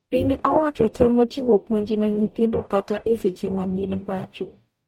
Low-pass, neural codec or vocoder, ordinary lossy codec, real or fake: 19.8 kHz; codec, 44.1 kHz, 0.9 kbps, DAC; MP3, 64 kbps; fake